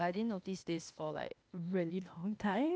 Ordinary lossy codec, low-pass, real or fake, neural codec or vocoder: none; none; fake; codec, 16 kHz, 0.8 kbps, ZipCodec